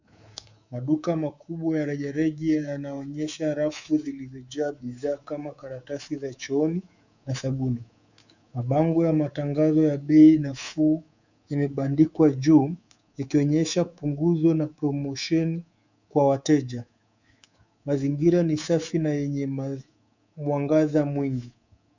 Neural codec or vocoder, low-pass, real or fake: codec, 24 kHz, 3.1 kbps, DualCodec; 7.2 kHz; fake